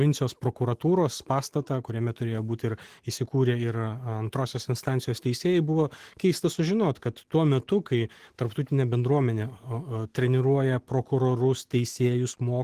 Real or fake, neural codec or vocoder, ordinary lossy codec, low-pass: fake; autoencoder, 48 kHz, 128 numbers a frame, DAC-VAE, trained on Japanese speech; Opus, 16 kbps; 14.4 kHz